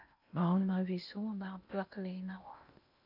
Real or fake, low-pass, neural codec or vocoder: fake; 5.4 kHz; codec, 16 kHz in and 24 kHz out, 0.8 kbps, FocalCodec, streaming, 65536 codes